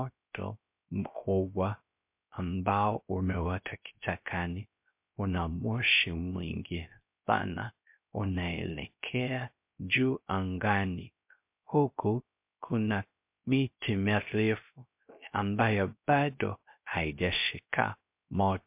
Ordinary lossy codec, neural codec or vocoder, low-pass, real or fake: MP3, 32 kbps; codec, 16 kHz, 0.3 kbps, FocalCodec; 3.6 kHz; fake